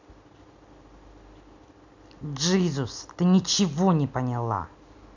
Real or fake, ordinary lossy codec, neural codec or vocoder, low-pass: real; none; none; 7.2 kHz